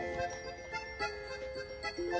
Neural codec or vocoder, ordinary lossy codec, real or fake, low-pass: none; none; real; none